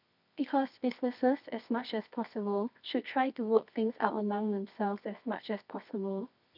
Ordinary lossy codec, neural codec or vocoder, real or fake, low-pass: none; codec, 24 kHz, 0.9 kbps, WavTokenizer, medium music audio release; fake; 5.4 kHz